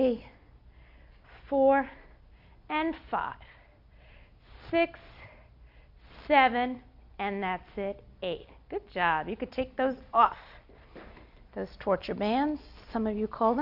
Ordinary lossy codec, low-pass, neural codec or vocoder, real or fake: AAC, 48 kbps; 5.4 kHz; none; real